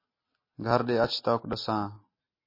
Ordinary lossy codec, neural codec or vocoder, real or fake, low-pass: MP3, 24 kbps; none; real; 5.4 kHz